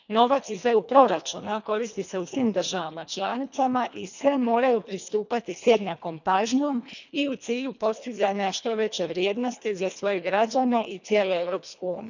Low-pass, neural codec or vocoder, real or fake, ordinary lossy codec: 7.2 kHz; codec, 24 kHz, 1.5 kbps, HILCodec; fake; none